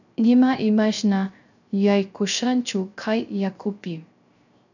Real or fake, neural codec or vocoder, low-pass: fake; codec, 16 kHz, 0.3 kbps, FocalCodec; 7.2 kHz